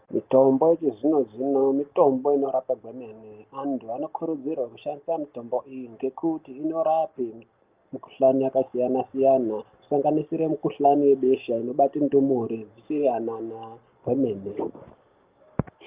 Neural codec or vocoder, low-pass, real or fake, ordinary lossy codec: none; 3.6 kHz; real; Opus, 32 kbps